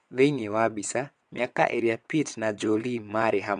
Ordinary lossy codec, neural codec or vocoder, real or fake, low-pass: MP3, 64 kbps; vocoder, 22.05 kHz, 80 mel bands, WaveNeXt; fake; 9.9 kHz